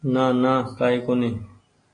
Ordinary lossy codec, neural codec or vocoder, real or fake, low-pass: AAC, 32 kbps; none; real; 9.9 kHz